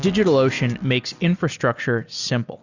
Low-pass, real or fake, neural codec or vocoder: 7.2 kHz; real; none